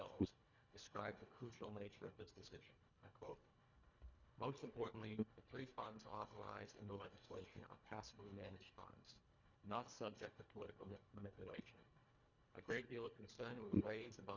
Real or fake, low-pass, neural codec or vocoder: fake; 7.2 kHz; codec, 24 kHz, 1.5 kbps, HILCodec